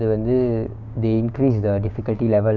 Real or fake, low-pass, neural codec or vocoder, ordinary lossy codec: fake; 7.2 kHz; codec, 16 kHz, 6 kbps, DAC; none